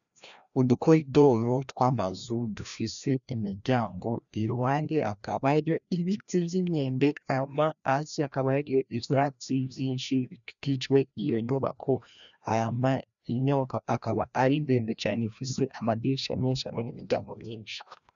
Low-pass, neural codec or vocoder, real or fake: 7.2 kHz; codec, 16 kHz, 1 kbps, FreqCodec, larger model; fake